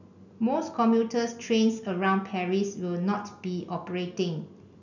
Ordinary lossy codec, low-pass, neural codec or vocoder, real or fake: none; 7.2 kHz; none; real